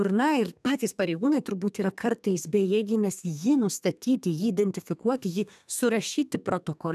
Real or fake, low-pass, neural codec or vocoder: fake; 14.4 kHz; codec, 32 kHz, 1.9 kbps, SNAC